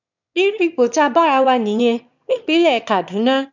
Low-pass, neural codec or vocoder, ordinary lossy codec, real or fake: 7.2 kHz; autoencoder, 22.05 kHz, a latent of 192 numbers a frame, VITS, trained on one speaker; none; fake